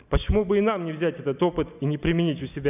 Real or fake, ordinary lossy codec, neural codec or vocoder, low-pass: real; none; none; 3.6 kHz